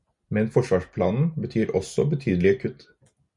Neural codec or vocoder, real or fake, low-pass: none; real; 10.8 kHz